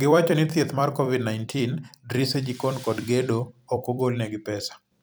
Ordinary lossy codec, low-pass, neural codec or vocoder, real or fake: none; none; vocoder, 44.1 kHz, 128 mel bands every 256 samples, BigVGAN v2; fake